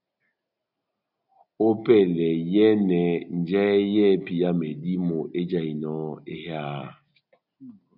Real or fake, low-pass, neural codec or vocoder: real; 5.4 kHz; none